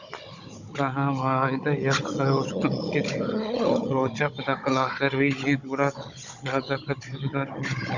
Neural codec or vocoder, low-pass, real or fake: codec, 16 kHz, 16 kbps, FunCodec, trained on Chinese and English, 50 frames a second; 7.2 kHz; fake